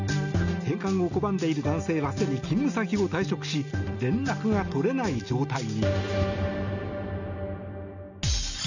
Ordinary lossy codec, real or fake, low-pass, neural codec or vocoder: none; real; 7.2 kHz; none